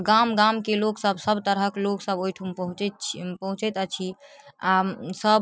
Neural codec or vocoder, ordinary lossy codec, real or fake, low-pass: none; none; real; none